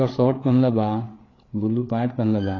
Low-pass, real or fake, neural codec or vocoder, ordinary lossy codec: 7.2 kHz; fake; codec, 16 kHz, 8 kbps, FreqCodec, larger model; AAC, 32 kbps